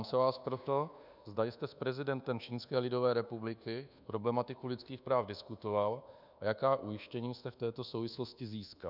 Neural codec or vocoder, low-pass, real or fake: codec, 24 kHz, 1.2 kbps, DualCodec; 5.4 kHz; fake